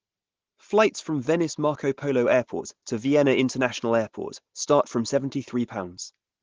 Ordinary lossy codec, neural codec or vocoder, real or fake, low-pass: Opus, 16 kbps; none; real; 7.2 kHz